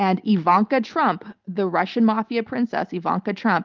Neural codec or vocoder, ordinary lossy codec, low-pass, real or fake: none; Opus, 24 kbps; 7.2 kHz; real